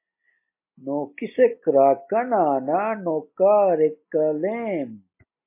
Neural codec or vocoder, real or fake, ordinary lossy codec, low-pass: none; real; MP3, 24 kbps; 3.6 kHz